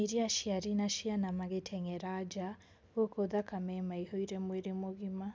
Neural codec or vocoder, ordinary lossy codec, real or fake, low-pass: none; none; real; none